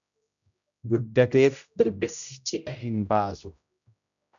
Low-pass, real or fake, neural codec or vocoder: 7.2 kHz; fake; codec, 16 kHz, 0.5 kbps, X-Codec, HuBERT features, trained on general audio